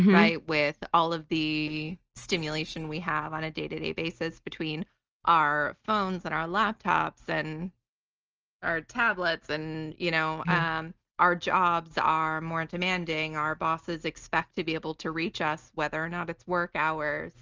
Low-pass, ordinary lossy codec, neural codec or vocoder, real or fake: 7.2 kHz; Opus, 24 kbps; none; real